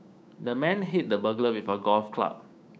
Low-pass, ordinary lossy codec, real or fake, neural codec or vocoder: none; none; fake; codec, 16 kHz, 6 kbps, DAC